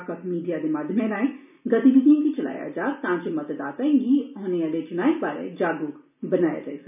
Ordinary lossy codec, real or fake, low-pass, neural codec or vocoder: none; real; 3.6 kHz; none